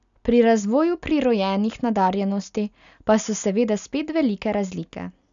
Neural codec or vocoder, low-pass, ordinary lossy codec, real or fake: none; 7.2 kHz; none; real